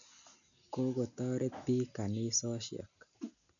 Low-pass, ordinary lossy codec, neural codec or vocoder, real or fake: 7.2 kHz; none; none; real